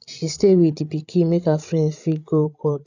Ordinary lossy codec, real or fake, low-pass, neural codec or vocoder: none; fake; 7.2 kHz; codec, 16 kHz, 16 kbps, FreqCodec, larger model